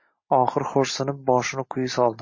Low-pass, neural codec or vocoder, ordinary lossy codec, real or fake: 7.2 kHz; none; MP3, 32 kbps; real